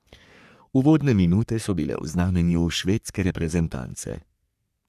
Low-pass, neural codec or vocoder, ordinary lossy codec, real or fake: 14.4 kHz; codec, 44.1 kHz, 3.4 kbps, Pupu-Codec; none; fake